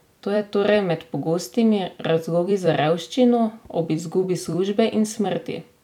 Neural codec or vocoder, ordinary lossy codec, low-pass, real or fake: vocoder, 44.1 kHz, 128 mel bands every 256 samples, BigVGAN v2; none; 19.8 kHz; fake